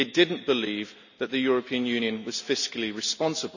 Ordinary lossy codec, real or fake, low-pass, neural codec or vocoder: none; real; 7.2 kHz; none